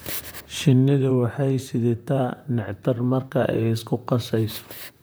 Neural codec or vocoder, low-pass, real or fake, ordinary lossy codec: vocoder, 44.1 kHz, 128 mel bands, Pupu-Vocoder; none; fake; none